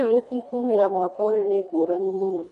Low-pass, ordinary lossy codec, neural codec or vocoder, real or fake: 10.8 kHz; none; codec, 24 kHz, 1.5 kbps, HILCodec; fake